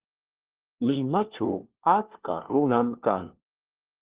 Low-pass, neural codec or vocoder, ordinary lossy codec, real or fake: 3.6 kHz; codec, 16 kHz, 1 kbps, FunCodec, trained on LibriTTS, 50 frames a second; Opus, 16 kbps; fake